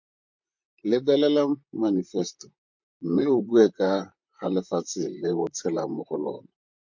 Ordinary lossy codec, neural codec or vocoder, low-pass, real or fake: MP3, 64 kbps; vocoder, 44.1 kHz, 128 mel bands, Pupu-Vocoder; 7.2 kHz; fake